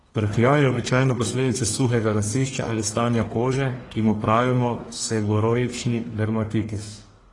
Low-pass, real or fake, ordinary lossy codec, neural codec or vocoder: 10.8 kHz; fake; AAC, 32 kbps; codec, 44.1 kHz, 1.7 kbps, Pupu-Codec